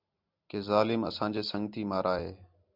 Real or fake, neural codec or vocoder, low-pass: real; none; 5.4 kHz